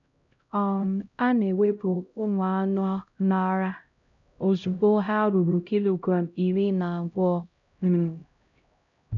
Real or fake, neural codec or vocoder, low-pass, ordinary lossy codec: fake; codec, 16 kHz, 0.5 kbps, X-Codec, HuBERT features, trained on LibriSpeech; 7.2 kHz; none